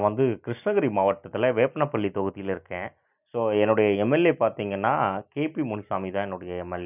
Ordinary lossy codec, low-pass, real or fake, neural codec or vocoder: none; 3.6 kHz; real; none